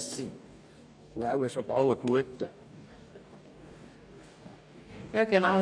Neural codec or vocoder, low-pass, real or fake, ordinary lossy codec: codec, 44.1 kHz, 2.6 kbps, DAC; 9.9 kHz; fake; none